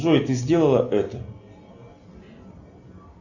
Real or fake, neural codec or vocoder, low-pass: real; none; 7.2 kHz